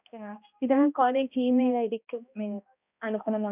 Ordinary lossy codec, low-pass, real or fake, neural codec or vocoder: none; 3.6 kHz; fake; codec, 16 kHz, 1 kbps, X-Codec, HuBERT features, trained on balanced general audio